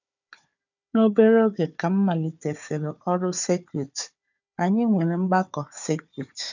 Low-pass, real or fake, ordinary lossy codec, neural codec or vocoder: 7.2 kHz; fake; none; codec, 16 kHz, 4 kbps, FunCodec, trained on Chinese and English, 50 frames a second